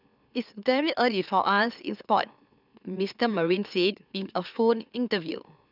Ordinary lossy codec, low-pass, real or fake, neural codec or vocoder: none; 5.4 kHz; fake; autoencoder, 44.1 kHz, a latent of 192 numbers a frame, MeloTTS